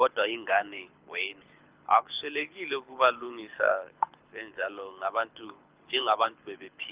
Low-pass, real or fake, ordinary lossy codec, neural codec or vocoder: 3.6 kHz; fake; Opus, 24 kbps; codec, 24 kHz, 6 kbps, HILCodec